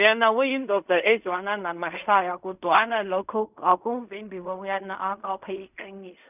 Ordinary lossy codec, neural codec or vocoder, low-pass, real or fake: none; codec, 16 kHz in and 24 kHz out, 0.4 kbps, LongCat-Audio-Codec, fine tuned four codebook decoder; 3.6 kHz; fake